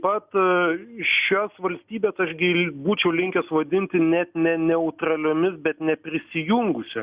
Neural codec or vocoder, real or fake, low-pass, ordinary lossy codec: none; real; 3.6 kHz; Opus, 64 kbps